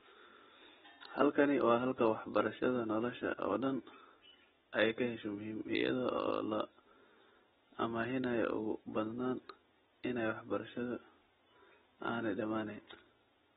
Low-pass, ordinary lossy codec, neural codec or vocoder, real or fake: 19.8 kHz; AAC, 16 kbps; none; real